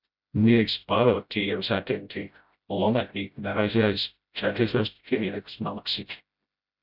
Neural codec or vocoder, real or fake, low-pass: codec, 16 kHz, 0.5 kbps, FreqCodec, smaller model; fake; 5.4 kHz